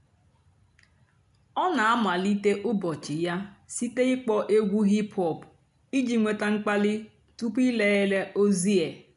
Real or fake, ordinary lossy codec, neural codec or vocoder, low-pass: real; none; none; 10.8 kHz